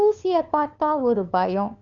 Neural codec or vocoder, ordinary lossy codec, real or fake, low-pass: codec, 16 kHz, 2 kbps, FunCodec, trained on LibriTTS, 25 frames a second; Opus, 64 kbps; fake; 7.2 kHz